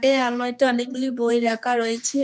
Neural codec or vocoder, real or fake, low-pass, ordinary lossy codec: codec, 16 kHz, 2 kbps, X-Codec, HuBERT features, trained on general audio; fake; none; none